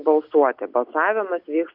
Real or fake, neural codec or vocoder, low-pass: real; none; 5.4 kHz